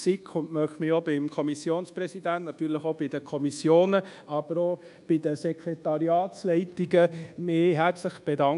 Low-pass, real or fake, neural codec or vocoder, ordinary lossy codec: 10.8 kHz; fake; codec, 24 kHz, 1.2 kbps, DualCodec; none